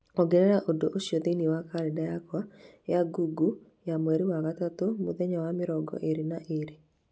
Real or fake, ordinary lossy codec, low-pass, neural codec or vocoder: real; none; none; none